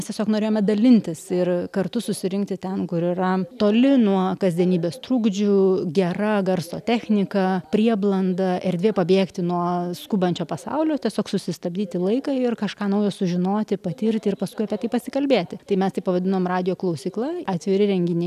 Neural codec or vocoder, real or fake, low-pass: none; real; 14.4 kHz